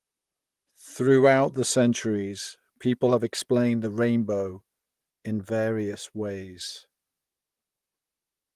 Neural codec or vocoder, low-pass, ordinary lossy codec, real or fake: vocoder, 44.1 kHz, 128 mel bands, Pupu-Vocoder; 14.4 kHz; Opus, 24 kbps; fake